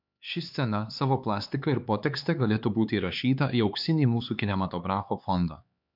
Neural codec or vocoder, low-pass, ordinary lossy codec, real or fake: codec, 16 kHz, 4 kbps, X-Codec, HuBERT features, trained on LibriSpeech; 5.4 kHz; MP3, 48 kbps; fake